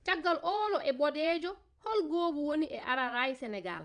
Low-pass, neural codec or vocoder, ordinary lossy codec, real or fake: 9.9 kHz; vocoder, 22.05 kHz, 80 mel bands, Vocos; MP3, 96 kbps; fake